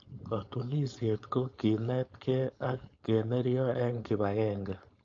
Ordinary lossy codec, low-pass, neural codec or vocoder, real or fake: AAC, 48 kbps; 7.2 kHz; codec, 16 kHz, 4.8 kbps, FACodec; fake